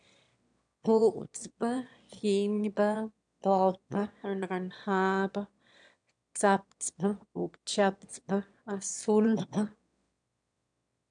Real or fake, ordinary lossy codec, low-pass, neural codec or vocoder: fake; none; 9.9 kHz; autoencoder, 22.05 kHz, a latent of 192 numbers a frame, VITS, trained on one speaker